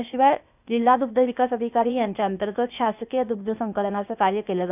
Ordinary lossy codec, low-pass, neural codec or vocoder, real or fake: none; 3.6 kHz; codec, 16 kHz, 0.8 kbps, ZipCodec; fake